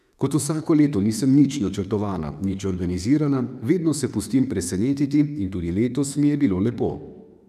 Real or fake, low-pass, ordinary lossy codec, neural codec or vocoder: fake; 14.4 kHz; none; autoencoder, 48 kHz, 32 numbers a frame, DAC-VAE, trained on Japanese speech